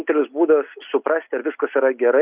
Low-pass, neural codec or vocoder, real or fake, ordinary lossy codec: 3.6 kHz; none; real; Opus, 64 kbps